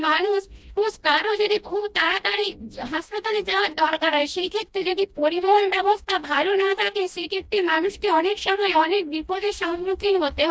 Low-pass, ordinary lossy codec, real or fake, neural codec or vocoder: none; none; fake; codec, 16 kHz, 1 kbps, FreqCodec, smaller model